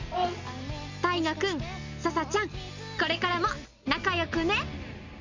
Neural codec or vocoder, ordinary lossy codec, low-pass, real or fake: none; Opus, 64 kbps; 7.2 kHz; real